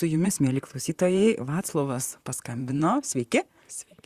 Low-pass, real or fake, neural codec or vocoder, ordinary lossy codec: 14.4 kHz; fake; vocoder, 44.1 kHz, 128 mel bands, Pupu-Vocoder; Opus, 64 kbps